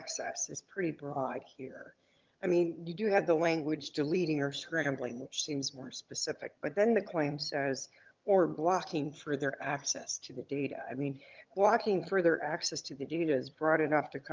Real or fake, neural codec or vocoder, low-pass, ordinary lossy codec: fake; vocoder, 22.05 kHz, 80 mel bands, HiFi-GAN; 7.2 kHz; Opus, 24 kbps